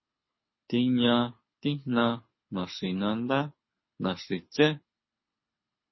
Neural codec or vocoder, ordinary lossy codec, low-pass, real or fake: codec, 24 kHz, 6 kbps, HILCodec; MP3, 24 kbps; 7.2 kHz; fake